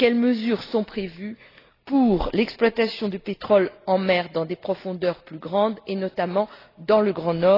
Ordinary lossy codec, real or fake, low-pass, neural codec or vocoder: AAC, 24 kbps; real; 5.4 kHz; none